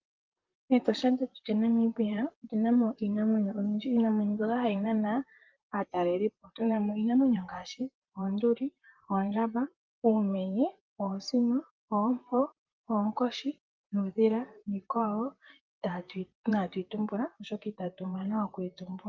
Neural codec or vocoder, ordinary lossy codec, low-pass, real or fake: vocoder, 44.1 kHz, 128 mel bands, Pupu-Vocoder; Opus, 32 kbps; 7.2 kHz; fake